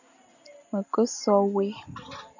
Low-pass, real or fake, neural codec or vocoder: 7.2 kHz; real; none